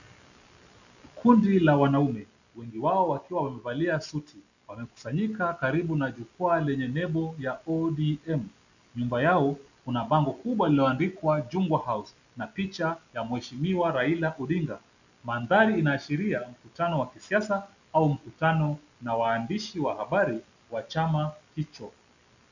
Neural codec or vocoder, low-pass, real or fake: none; 7.2 kHz; real